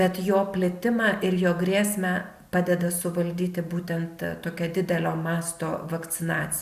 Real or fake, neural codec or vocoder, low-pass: real; none; 14.4 kHz